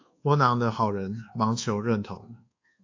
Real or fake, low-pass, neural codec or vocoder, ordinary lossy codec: fake; 7.2 kHz; codec, 24 kHz, 1.2 kbps, DualCodec; AAC, 48 kbps